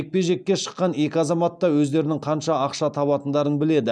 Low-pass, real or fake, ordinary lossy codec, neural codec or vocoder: none; real; none; none